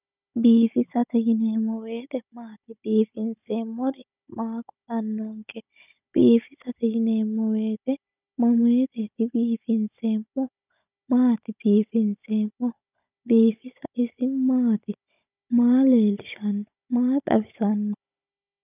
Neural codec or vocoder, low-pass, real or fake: codec, 16 kHz, 16 kbps, FunCodec, trained on Chinese and English, 50 frames a second; 3.6 kHz; fake